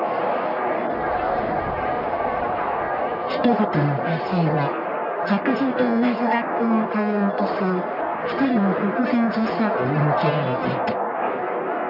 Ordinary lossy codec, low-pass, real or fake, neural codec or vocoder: none; 5.4 kHz; fake; codec, 44.1 kHz, 1.7 kbps, Pupu-Codec